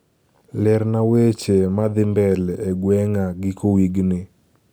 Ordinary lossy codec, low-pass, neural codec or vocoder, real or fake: none; none; none; real